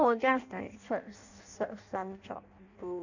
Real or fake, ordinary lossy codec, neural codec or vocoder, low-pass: fake; none; codec, 16 kHz in and 24 kHz out, 0.6 kbps, FireRedTTS-2 codec; 7.2 kHz